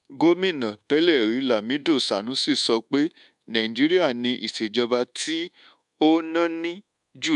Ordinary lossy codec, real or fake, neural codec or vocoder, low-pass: AAC, 96 kbps; fake; codec, 24 kHz, 1.2 kbps, DualCodec; 10.8 kHz